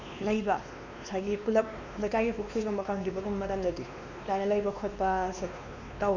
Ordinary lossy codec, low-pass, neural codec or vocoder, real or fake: none; 7.2 kHz; codec, 16 kHz, 2 kbps, X-Codec, WavLM features, trained on Multilingual LibriSpeech; fake